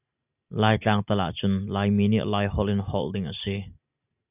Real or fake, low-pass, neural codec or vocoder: real; 3.6 kHz; none